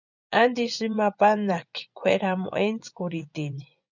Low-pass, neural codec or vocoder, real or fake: 7.2 kHz; vocoder, 24 kHz, 100 mel bands, Vocos; fake